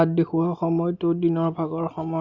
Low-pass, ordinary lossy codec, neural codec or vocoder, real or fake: 7.2 kHz; Opus, 64 kbps; none; real